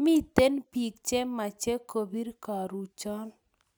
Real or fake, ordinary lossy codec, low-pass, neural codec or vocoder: real; none; none; none